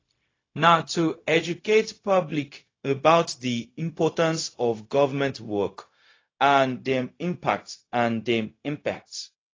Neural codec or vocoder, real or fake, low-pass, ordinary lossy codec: codec, 16 kHz, 0.4 kbps, LongCat-Audio-Codec; fake; 7.2 kHz; AAC, 32 kbps